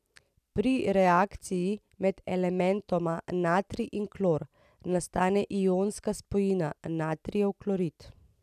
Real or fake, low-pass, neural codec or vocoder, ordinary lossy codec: real; 14.4 kHz; none; none